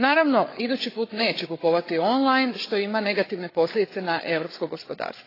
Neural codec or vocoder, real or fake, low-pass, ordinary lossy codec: codec, 16 kHz, 8 kbps, FreqCodec, larger model; fake; 5.4 kHz; AAC, 24 kbps